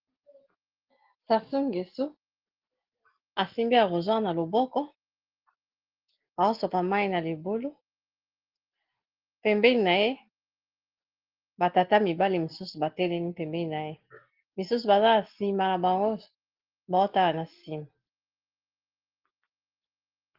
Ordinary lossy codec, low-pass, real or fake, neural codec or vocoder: Opus, 16 kbps; 5.4 kHz; real; none